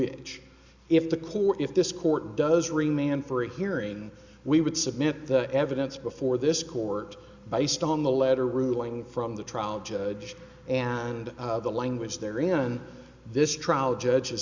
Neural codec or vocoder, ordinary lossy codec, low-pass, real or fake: none; Opus, 64 kbps; 7.2 kHz; real